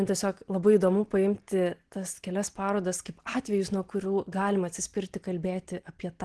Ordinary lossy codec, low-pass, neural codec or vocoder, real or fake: Opus, 16 kbps; 10.8 kHz; none; real